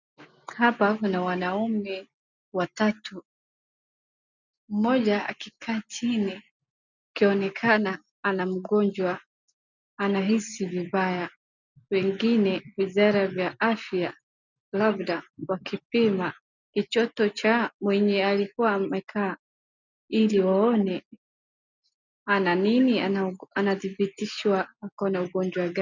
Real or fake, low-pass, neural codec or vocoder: real; 7.2 kHz; none